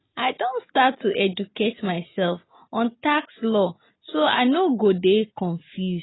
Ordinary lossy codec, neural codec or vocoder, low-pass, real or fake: AAC, 16 kbps; none; 7.2 kHz; real